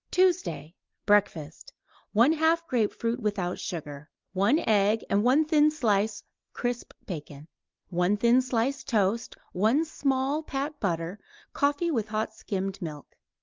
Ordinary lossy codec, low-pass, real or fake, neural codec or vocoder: Opus, 24 kbps; 7.2 kHz; real; none